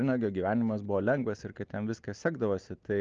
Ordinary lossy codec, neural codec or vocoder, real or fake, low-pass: Opus, 24 kbps; none; real; 7.2 kHz